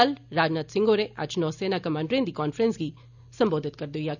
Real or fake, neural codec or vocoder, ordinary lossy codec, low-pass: real; none; none; 7.2 kHz